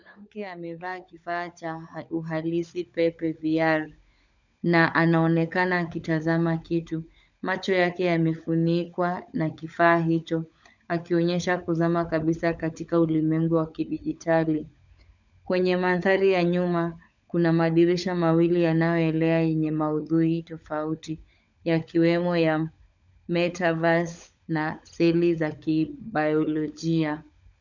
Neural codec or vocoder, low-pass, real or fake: codec, 16 kHz, 16 kbps, FunCodec, trained on LibriTTS, 50 frames a second; 7.2 kHz; fake